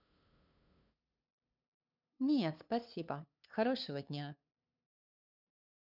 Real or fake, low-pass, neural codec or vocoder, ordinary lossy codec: fake; 5.4 kHz; codec, 16 kHz, 2 kbps, FunCodec, trained on LibriTTS, 25 frames a second; none